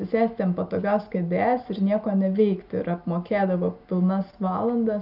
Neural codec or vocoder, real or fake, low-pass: none; real; 5.4 kHz